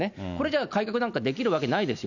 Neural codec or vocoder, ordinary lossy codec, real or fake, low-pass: none; none; real; 7.2 kHz